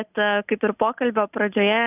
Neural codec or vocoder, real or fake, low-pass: none; real; 3.6 kHz